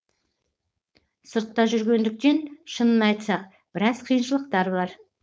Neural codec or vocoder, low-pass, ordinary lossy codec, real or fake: codec, 16 kHz, 4.8 kbps, FACodec; none; none; fake